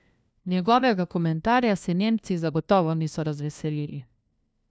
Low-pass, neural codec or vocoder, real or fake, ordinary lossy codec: none; codec, 16 kHz, 1 kbps, FunCodec, trained on LibriTTS, 50 frames a second; fake; none